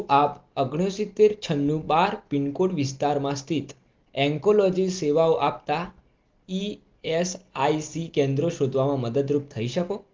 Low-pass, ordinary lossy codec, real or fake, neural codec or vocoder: 7.2 kHz; Opus, 32 kbps; real; none